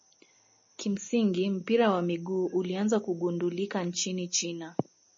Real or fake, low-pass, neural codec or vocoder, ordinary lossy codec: real; 7.2 kHz; none; MP3, 32 kbps